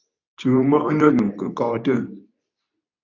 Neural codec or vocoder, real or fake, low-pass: codec, 24 kHz, 0.9 kbps, WavTokenizer, medium speech release version 2; fake; 7.2 kHz